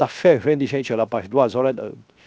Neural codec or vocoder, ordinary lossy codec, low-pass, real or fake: codec, 16 kHz, 0.7 kbps, FocalCodec; none; none; fake